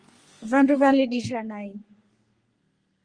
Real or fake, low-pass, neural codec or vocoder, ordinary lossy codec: fake; 9.9 kHz; codec, 32 kHz, 1.9 kbps, SNAC; Opus, 24 kbps